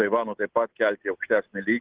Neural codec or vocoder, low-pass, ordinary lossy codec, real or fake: none; 3.6 kHz; Opus, 16 kbps; real